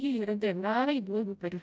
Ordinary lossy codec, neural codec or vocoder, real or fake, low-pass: none; codec, 16 kHz, 0.5 kbps, FreqCodec, smaller model; fake; none